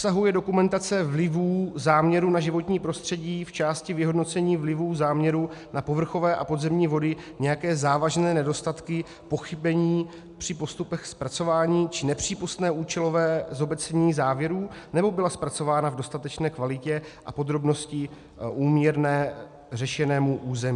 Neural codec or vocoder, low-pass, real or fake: none; 10.8 kHz; real